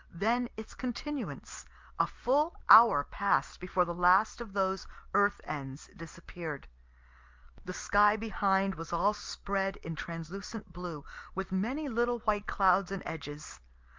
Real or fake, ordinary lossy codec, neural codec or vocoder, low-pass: real; Opus, 24 kbps; none; 7.2 kHz